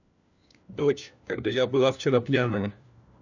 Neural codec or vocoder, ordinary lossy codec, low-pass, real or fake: codec, 16 kHz, 1 kbps, FunCodec, trained on LibriTTS, 50 frames a second; none; 7.2 kHz; fake